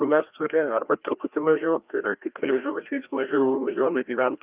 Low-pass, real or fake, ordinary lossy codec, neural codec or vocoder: 3.6 kHz; fake; Opus, 32 kbps; codec, 16 kHz, 1 kbps, FreqCodec, larger model